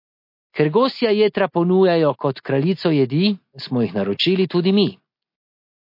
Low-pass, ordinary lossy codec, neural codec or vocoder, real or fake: 5.4 kHz; MP3, 32 kbps; none; real